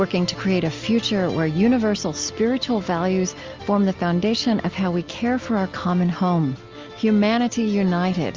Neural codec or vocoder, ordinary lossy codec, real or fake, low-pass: none; Opus, 32 kbps; real; 7.2 kHz